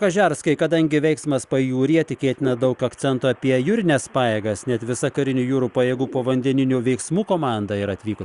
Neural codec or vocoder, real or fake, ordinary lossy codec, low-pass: none; real; Opus, 64 kbps; 10.8 kHz